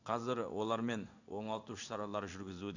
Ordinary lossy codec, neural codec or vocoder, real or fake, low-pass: none; codec, 16 kHz in and 24 kHz out, 1 kbps, XY-Tokenizer; fake; 7.2 kHz